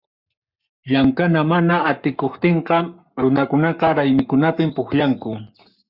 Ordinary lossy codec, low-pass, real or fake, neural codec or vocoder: Opus, 64 kbps; 5.4 kHz; fake; codec, 44.1 kHz, 7.8 kbps, Pupu-Codec